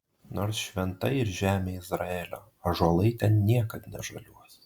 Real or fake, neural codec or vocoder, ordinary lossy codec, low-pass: real; none; Opus, 64 kbps; 19.8 kHz